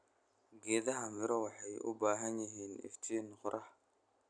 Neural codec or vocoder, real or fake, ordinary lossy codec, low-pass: none; real; none; 9.9 kHz